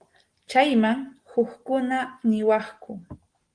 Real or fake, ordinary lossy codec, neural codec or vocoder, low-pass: real; Opus, 24 kbps; none; 9.9 kHz